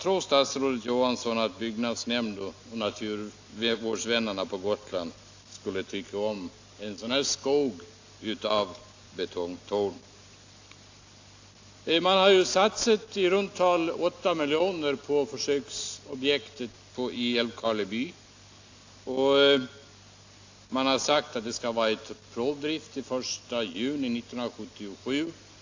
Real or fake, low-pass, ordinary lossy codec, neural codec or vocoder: real; 7.2 kHz; AAC, 48 kbps; none